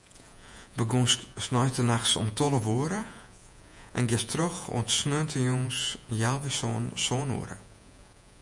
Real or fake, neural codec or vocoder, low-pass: fake; vocoder, 48 kHz, 128 mel bands, Vocos; 10.8 kHz